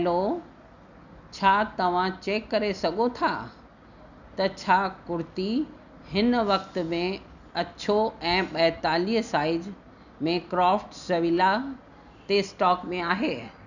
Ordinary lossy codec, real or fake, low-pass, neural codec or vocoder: none; real; 7.2 kHz; none